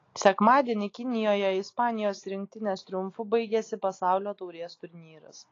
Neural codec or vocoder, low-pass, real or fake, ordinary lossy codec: none; 7.2 kHz; real; AAC, 32 kbps